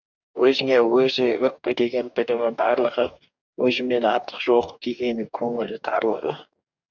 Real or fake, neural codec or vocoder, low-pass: fake; codec, 44.1 kHz, 2.6 kbps, DAC; 7.2 kHz